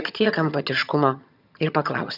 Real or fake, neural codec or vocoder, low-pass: fake; vocoder, 22.05 kHz, 80 mel bands, HiFi-GAN; 5.4 kHz